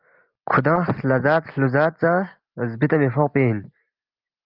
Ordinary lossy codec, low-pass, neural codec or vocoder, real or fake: Opus, 32 kbps; 5.4 kHz; none; real